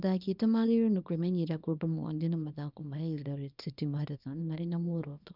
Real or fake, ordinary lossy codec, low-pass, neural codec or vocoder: fake; none; 5.4 kHz; codec, 24 kHz, 0.9 kbps, WavTokenizer, small release